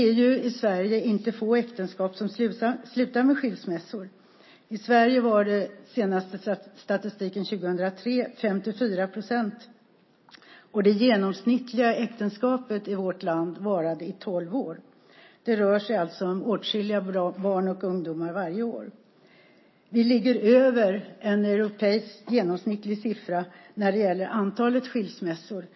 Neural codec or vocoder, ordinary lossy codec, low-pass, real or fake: none; MP3, 24 kbps; 7.2 kHz; real